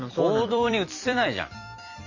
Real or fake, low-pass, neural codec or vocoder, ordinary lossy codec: fake; 7.2 kHz; vocoder, 44.1 kHz, 128 mel bands every 256 samples, BigVGAN v2; none